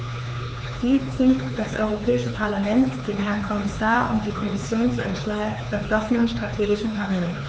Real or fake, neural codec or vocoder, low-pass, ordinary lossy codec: fake; codec, 16 kHz, 4 kbps, X-Codec, HuBERT features, trained on LibriSpeech; none; none